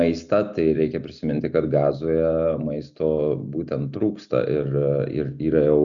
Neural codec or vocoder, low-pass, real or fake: none; 7.2 kHz; real